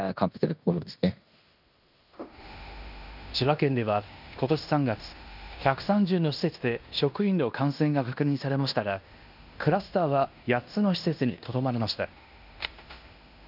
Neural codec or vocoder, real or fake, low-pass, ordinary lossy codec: codec, 16 kHz in and 24 kHz out, 0.9 kbps, LongCat-Audio-Codec, fine tuned four codebook decoder; fake; 5.4 kHz; none